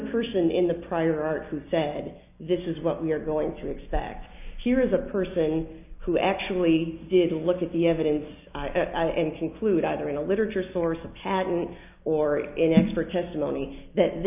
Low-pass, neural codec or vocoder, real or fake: 3.6 kHz; none; real